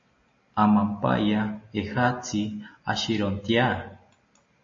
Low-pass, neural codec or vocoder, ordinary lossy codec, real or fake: 7.2 kHz; none; MP3, 32 kbps; real